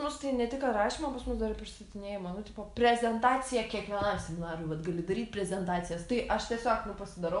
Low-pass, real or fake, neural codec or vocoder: 10.8 kHz; real; none